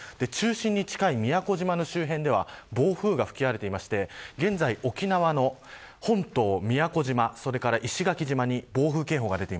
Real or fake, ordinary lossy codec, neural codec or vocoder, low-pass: real; none; none; none